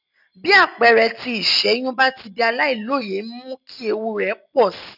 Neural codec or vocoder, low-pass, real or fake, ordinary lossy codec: none; 5.4 kHz; real; none